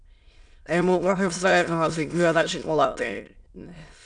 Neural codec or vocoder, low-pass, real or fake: autoencoder, 22.05 kHz, a latent of 192 numbers a frame, VITS, trained on many speakers; 9.9 kHz; fake